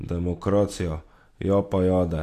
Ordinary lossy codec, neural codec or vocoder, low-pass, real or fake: AAC, 64 kbps; none; 14.4 kHz; real